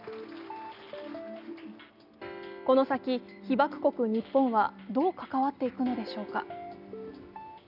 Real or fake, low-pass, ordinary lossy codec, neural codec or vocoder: real; 5.4 kHz; none; none